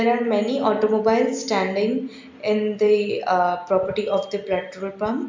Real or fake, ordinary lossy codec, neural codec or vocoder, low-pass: real; MP3, 64 kbps; none; 7.2 kHz